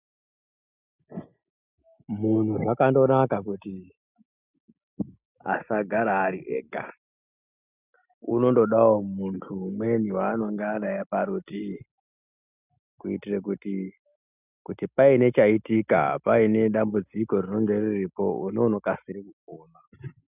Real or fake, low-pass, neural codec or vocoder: real; 3.6 kHz; none